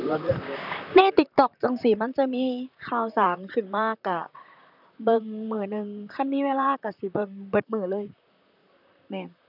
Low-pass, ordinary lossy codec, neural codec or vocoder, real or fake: 5.4 kHz; none; vocoder, 44.1 kHz, 128 mel bands, Pupu-Vocoder; fake